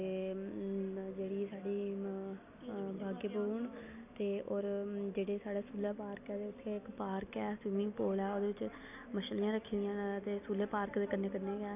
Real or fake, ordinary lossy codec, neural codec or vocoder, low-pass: real; none; none; 3.6 kHz